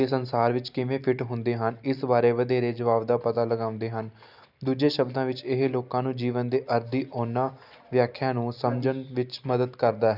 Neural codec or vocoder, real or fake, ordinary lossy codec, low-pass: none; real; none; 5.4 kHz